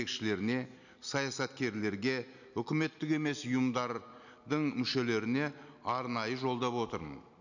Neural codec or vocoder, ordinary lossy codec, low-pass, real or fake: none; none; 7.2 kHz; real